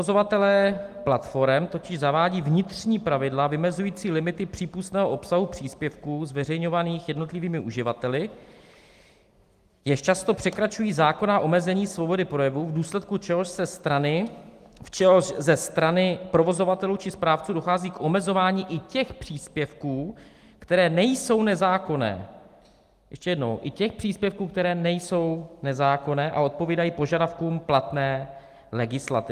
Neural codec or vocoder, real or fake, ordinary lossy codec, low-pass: none; real; Opus, 24 kbps; 14.4 kHz